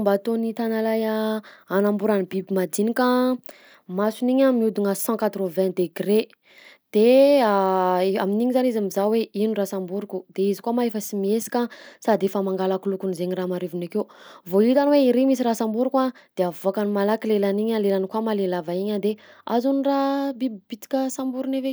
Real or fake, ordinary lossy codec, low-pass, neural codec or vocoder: real; none; none; none